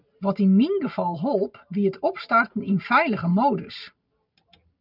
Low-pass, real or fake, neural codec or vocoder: 5.4 kHz; real; none